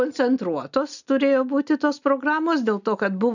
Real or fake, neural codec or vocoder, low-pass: real; none; 7.2 kHz